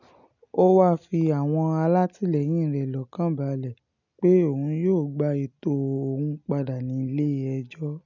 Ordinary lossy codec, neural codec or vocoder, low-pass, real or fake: none; none; 7.2 kHz; real